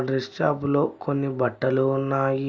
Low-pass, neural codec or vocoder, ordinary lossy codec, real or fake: none; none; none; real